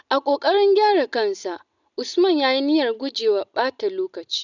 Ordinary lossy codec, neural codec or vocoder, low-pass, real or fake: none; none; 7.2 kHz; real